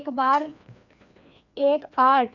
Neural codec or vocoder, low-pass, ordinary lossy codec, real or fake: codec, 16 kHz, 2 kbps, FreqCodec, larger model; 7.2 kHz; none; fake